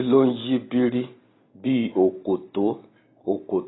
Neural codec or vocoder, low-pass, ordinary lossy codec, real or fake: none; 7.2 kHz; AAC, 16 kbps; real